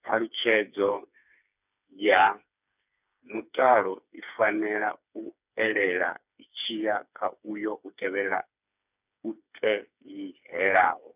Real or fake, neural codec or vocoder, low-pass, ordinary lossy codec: fake; codec, 16 kHz, 4 kbps, FreqCodec, smaller model; 3.6 kHz; none